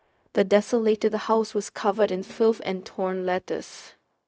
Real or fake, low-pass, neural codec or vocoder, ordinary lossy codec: fake; none; codec, 16 kHz, 0.4 kbps, LongCat-Audio-Codec; none